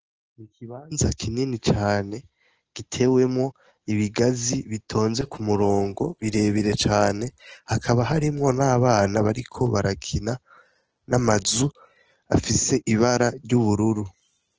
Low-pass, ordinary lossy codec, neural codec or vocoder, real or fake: 7.2 kHz; Opus, 16 kbps; none; real